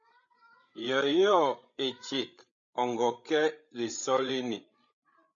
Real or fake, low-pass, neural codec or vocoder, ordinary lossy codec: fake; 7.2 kHz; codec, 16 kHz, 8 kbps, FreqCodec, larger model; MP3, 64 kbps